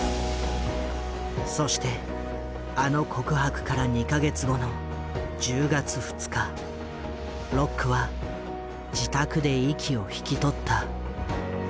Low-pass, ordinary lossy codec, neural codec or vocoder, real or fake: none; none; none; real